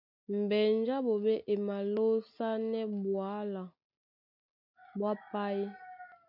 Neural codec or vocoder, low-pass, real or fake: none; 5.4 kHz; real